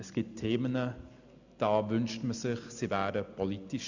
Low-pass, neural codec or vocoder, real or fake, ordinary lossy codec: 7.2 kHz; none; real; none